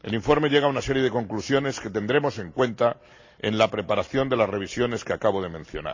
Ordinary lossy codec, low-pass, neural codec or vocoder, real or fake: AAC, 48 kbps; 7.2 kHz; none; real